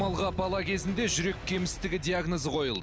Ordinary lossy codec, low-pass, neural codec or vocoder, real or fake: none; none; none; real